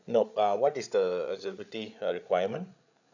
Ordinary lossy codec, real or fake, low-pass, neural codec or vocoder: none; fake; 7.2 kHz; codec, 16 kHz, 8 kbps, FreqCodec, larger model